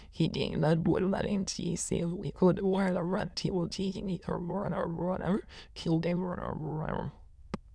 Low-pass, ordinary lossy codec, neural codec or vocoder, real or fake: none; none; autoencoder, 22.05 kHz, a latent of 192 numbers a frame, VITS, trained on many speakers; fake